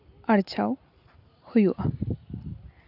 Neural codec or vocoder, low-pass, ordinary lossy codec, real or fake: none; 5.4 kHz; none; real